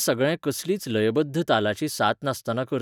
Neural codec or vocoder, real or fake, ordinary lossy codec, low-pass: none; real; none; 19.8 kHz